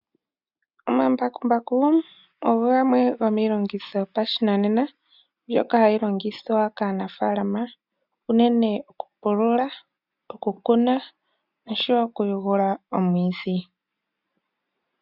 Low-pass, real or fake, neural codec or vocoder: 5.4 kHz; real; none